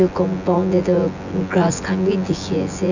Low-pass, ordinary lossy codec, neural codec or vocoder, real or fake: 7.2 kHz; none; vocoder, 24 kHz, 100 mel bands, Vocos; fake